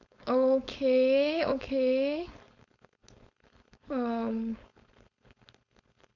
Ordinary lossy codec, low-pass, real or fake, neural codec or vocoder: none; 7.2 kHz; fake; codec, 16 kHz, 4.8 kbps, FACodec